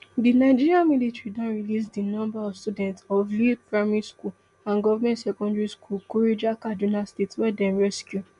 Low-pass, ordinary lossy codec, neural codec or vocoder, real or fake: 10.8 kHz; none; none; real